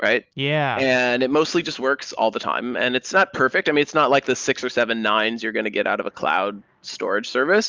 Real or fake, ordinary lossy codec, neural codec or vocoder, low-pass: real; Opus, 24 kbps; none; 7.2 kHz